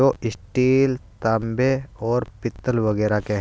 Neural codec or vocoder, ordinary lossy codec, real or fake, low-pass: none; none; real; none